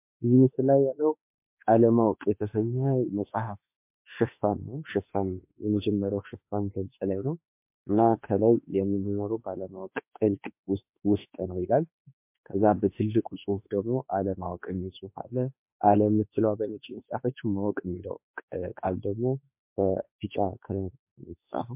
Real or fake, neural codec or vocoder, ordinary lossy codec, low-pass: fake; autoencoder, 48 kHz, 32 numbers a frame, DAC-VAE, trained on Japanese speech; MP3, 32 kbps; 3.6 kHz